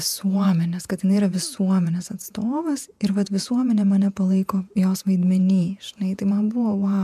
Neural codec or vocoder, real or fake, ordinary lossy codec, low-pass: vocoder, 48 kHz, 128 mel bands, Vocos; fake; MP3, 96 kbps; 14.4 kHz